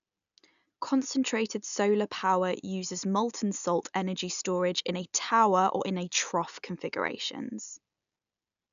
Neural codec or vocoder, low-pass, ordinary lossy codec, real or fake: none; 7.2 kHz; none; real